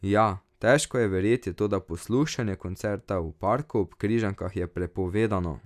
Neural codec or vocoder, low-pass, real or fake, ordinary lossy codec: none; 14.4 kHz; real; none